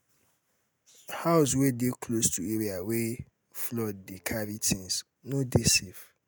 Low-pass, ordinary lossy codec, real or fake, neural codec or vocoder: none; none; real; none